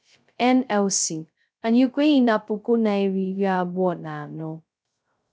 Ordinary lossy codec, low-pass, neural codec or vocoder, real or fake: none; none; codec, 16 kHz, 0.2 kbps, FocalCodec; fake